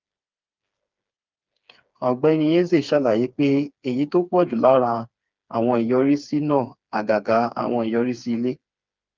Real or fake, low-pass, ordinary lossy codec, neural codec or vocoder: fake; 7.2 kHz; Opus, 24 kbps; codec, 16 kHz, 4 kbps, FreqCodec, smaller model